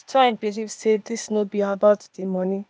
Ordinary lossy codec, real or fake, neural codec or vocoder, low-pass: none; fake; codec, 16 kHz, 0.8 kbps, ZipCodec; none